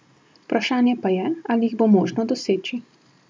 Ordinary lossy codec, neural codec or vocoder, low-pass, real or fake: none; none; none; real